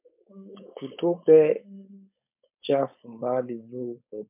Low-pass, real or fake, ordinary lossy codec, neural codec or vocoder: 3.6 kHz; fake; MP3, 32 kbps; codec, 16 kHz, 4.8 kbps, FACodec